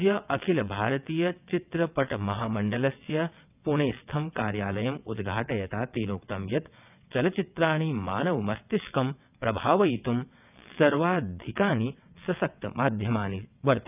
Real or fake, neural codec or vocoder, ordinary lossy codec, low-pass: fake; vocoder, 22.05 kHz, 80 mel bands, WaveNeXt; none; 3.6 kHz